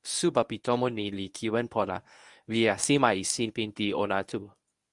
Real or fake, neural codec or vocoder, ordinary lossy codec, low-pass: fake; codec, 24 kHz, 0.9 kbps, WavTokenizer, medium speech release version 1; Opus, 64 kbps; 10.8 kHz